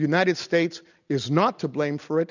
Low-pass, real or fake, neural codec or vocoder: 7.2 kHz; real; none